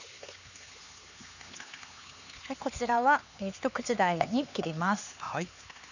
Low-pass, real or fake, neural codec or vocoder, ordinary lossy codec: 7.2 kHz; fake; codec, 16 kHz, 4 kbps, X-Codec, HuBERT features, trained on LibriSpeech; none